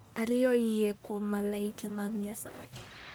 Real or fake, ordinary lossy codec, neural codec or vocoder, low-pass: fake; none; codec, 44.1 kHz, 1.7 kbps, Pupu-Codec; none